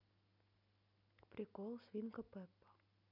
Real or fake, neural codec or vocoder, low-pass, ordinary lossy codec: real; none; 5.4 kHz; none